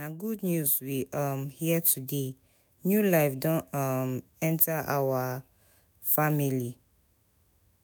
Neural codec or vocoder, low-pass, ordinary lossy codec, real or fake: autoencoder, 48 kHz, 128 numbers a frame, DAC-VAE, trained on Japanese speech; none; none; fake